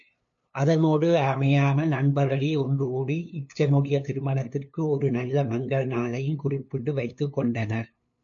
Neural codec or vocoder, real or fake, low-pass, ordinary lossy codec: codec, 16 kHz, 2 kbps, FunCodec, trained on LibriTTS, 25 frames a second; fake; 7.2 kHz; MP3, 48 kbps